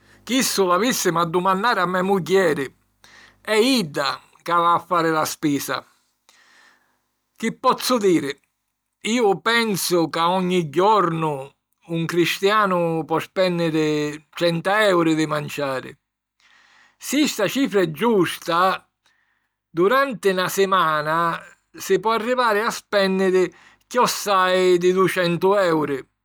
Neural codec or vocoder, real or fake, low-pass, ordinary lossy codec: none; real; none; none